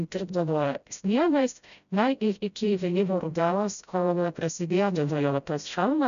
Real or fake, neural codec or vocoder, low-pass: fake; codec, 16 kHz, 0.5 kbps, FreqCodec, smaller model; 7.2 kHz